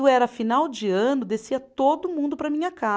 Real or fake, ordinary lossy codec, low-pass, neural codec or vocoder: real; none; none; none